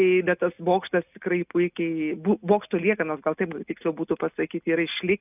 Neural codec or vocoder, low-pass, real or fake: none; 3.6 kHz; real